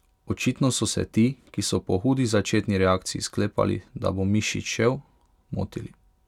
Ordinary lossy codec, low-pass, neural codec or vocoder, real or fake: none; 19.8 kHz; none; real